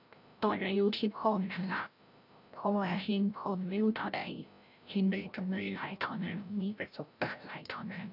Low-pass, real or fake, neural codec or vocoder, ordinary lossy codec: 5.4 kHz; fake; codec, 16 kHz, 0.5 kbps, FreqCodec, larger model; none